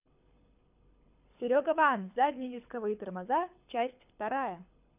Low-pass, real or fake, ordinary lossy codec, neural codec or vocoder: 3.6 kHz; fake; none; codec, 24 kHz, 6 kbps, HILCodec